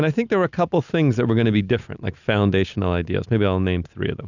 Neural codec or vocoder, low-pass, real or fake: none; 7.2 kHz; real